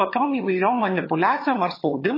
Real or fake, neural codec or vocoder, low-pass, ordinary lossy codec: fake; vocoder, 22.05 kHz, 80 mel bands, HiFi-GAN; 5.4 kHz; MP3, 24 kbps